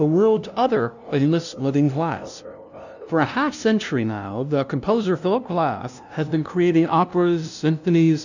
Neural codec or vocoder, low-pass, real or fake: codec, 16 kHz, 0.5 kbps, FunCodec, trained on LibriTTS, 25 frames a second; 7.2 kHz; fake